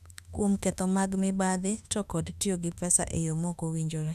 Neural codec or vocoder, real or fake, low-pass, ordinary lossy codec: autoencoder, 48 kHz, 32 numbers a frame, DAC-VAE, trained on Japanese speech; fake; 14.4 kHz; none